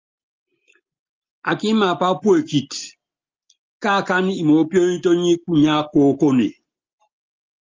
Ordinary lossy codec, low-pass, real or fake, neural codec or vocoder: Opus, 24 kbps; 7.2 kHz; real; none